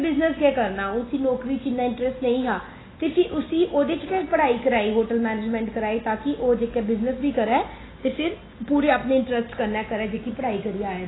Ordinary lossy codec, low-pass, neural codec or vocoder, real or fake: AAC, 16 kbps; 7.2 kHz; none; real